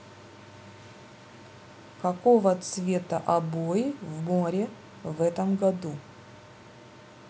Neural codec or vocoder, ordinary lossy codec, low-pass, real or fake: none; none; none; real